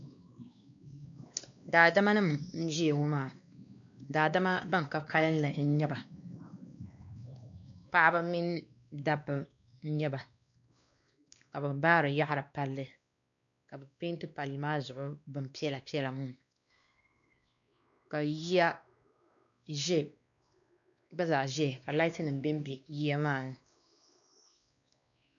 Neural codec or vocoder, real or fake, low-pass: codec, 16 kHz, 2 kbps, X-Codec, WavLM features, trained on Multilingual LibriSpeech; fake; 7.2 kHz